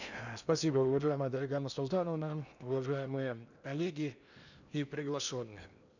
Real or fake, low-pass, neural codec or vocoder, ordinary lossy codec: fake; 7.2 kHz; codec, 16 kHz in and 24 kHz out, 0.8 kbps, FocalCodec, streaming, 65536 codes; none